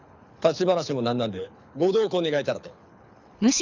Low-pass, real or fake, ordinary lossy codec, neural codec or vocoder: 7.2 kHz; fake; none; codec, 24 kHz, 6 kbps, HILCodec